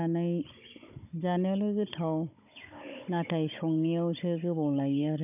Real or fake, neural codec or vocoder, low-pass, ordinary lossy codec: fake; codec, 16 kHz, 16 kbps, FunCodec, trained on Chinese and English, 50 frames a second; 3.6 kHz; none